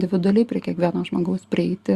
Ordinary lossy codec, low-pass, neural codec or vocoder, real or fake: Opus, 64 kbps; 14.4 kHz; none; real